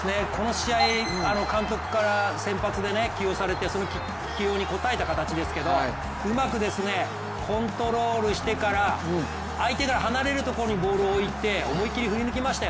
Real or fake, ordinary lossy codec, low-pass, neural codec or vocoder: real; none; none; none